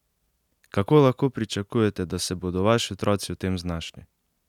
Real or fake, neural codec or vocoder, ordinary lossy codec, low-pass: real; none; none; 19.8 kHz